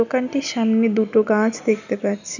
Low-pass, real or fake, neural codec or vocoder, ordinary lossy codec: 7.2 kHz; real; none; none